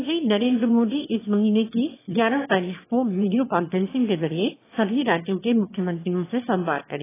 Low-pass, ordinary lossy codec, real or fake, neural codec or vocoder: 3.6 kHz; AAC, 16 kbps; fake; autoencoder, 22.05 kHz, a latent of 192 numbers a frame, VITS, trained on one speaker